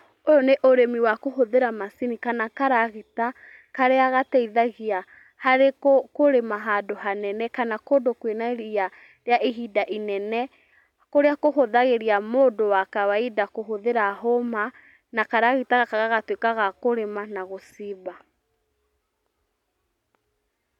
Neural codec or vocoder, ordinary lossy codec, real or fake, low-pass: none; none; real; 19.8 kHz